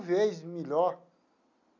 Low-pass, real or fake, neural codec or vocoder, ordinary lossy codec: 7.2 kHz; real; none; none